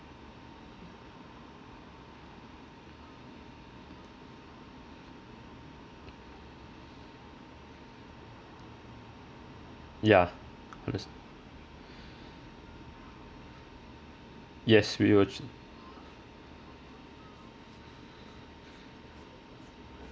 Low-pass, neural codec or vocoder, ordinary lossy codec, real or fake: none; none; none; real